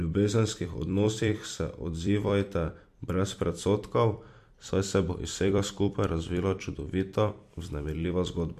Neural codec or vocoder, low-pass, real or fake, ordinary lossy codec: vocoder, 44.1 kHz, 128 mel bands every 256 samples, BigVGAN v2; 14.4 kHz; fake; MP3, 64 kbps